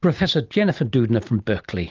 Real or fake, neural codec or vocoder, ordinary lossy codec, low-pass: real; none; Opus, 32 kbps; 7.2 kHz